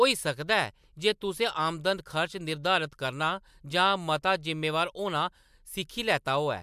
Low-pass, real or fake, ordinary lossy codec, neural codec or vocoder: 14.4 kHz; real; MP3, 96 kbps; none